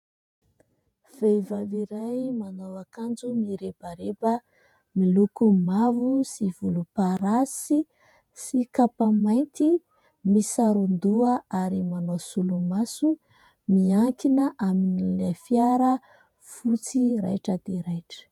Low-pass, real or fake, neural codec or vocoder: 19.8 kHz; fake; vocoder, 48 kHz, 128 mel bands, Vocos